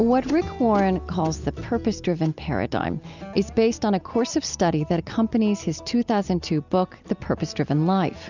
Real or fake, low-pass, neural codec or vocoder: real; 7.2 kHz; none